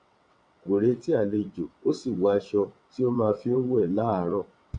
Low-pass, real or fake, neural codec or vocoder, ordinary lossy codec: 9.9 kHz; fake; vocoder, 22.05 kHz, 80 mel bands, WaveNeXt; none